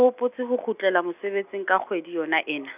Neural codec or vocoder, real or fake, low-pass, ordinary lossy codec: none; real; 3.6 kHz; none